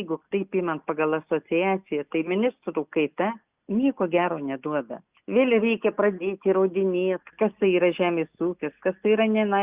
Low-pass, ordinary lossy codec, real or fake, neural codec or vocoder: 3.6 kHz; Opus, 64 kbps; real; none